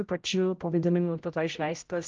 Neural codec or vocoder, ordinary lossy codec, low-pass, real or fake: codec, 16 kHz, 0.5 kbps, X-Codec, HuBERT features, trained on general audio; Opus, 24 kbps; 7.2 kHz; fake